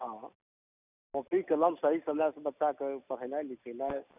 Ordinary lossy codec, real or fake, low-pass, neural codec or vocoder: none; real; 3.6 kHz; none